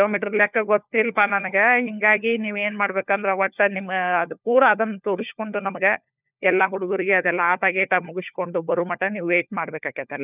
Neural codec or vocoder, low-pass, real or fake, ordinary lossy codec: codec, 16 kHz, 4 kbps, FunCodec, trained on LibriTTS, 50 frames a second; 3.6 kHz; fake; none